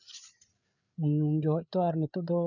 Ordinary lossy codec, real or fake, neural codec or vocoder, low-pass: none; fake; codec, 16 kHz, 8 kbps, FreqCodec, larger model; 7.2 kHz